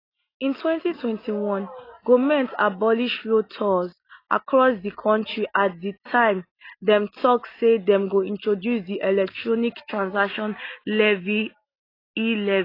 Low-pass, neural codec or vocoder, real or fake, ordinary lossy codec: 5.4 kHz; none; real; AAC, 24 kbps